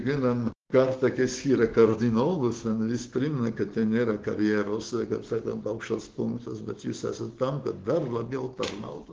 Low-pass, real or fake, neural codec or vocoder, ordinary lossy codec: 7.2 kHz; fake; codec, 16 kHz, 6 kbps, DAC; Opus, 16 kbps